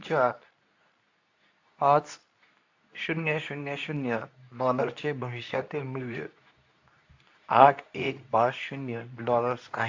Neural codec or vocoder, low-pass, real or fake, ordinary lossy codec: codec, 24 kHz, 0.9 kbps, WavTokenizer, medium speech release version 2; 7.2 kHz; fake; AAC, 48 kbps